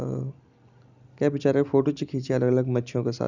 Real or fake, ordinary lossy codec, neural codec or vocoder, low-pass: real; none; none; 7.2 kHz